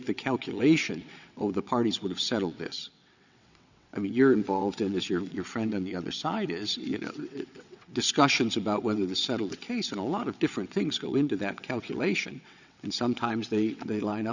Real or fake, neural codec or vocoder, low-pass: fake; vocoder, 22.05 kHz, 80 mel bands, WaveNeXt; 7.2 kHz